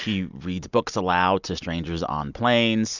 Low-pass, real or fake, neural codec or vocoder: 7.2 kHz; real; none